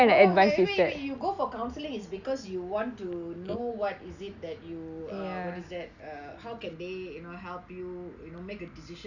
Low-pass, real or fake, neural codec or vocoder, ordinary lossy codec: 7.2 kHz; real; none; none